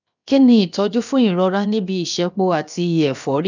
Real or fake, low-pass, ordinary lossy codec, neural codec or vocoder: fake; 7.2 kHz; none; codec, 16 kHz, about 1 kbps, DyCAST, with the encoder's durations